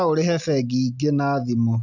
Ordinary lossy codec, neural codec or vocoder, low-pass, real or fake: none; none; 7.2 kHz; real